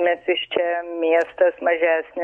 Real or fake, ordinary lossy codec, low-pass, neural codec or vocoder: fake; MP3, 48 kbps; 19.8 kHz; autoencoder, 48 kHz, 128 numbers a frame, DAC-VAE, trained on Japanese speech